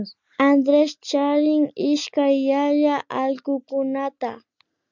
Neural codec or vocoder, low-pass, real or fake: none; 7.2 kHz; real